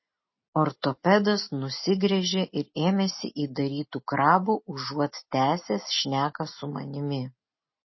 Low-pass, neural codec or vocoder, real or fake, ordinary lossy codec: 7.2 kHz; none; real; MP3, 24 kbps